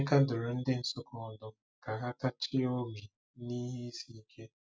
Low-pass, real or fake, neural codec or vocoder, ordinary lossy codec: none; real; none; none